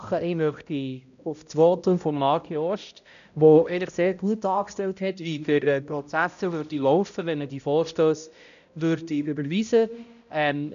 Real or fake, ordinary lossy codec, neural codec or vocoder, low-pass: fake; none; codec, 16 kHz, 0.5 kbps, X-Codec, HuBERT features, trained on balanced general audio; 7.2 kHz